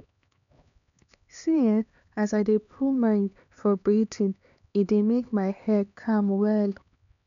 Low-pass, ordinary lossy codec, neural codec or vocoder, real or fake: 7.2 kHz; none; codec, 16 kHz, 2 kbps, X-Codec, HuBERT features, trained on LibriSpeech; fake